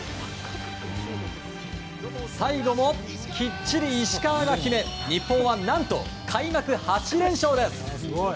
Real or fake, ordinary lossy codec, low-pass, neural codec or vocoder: real; none; none; none